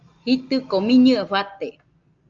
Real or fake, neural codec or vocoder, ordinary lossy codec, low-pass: real; none; Opus, 24 kbps; 7.2 kHz